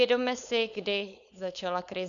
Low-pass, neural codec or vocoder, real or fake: 7.2 kHz; codec, 16 kHz, 4.8 kbps, FACodec; fake